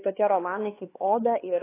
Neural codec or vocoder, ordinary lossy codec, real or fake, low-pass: codec, 16 kHz, 2 kbps, X-Codec, HuBERT features, trained on LibriSpeech; AAC, 16 kbps; fake; 3.6 kHz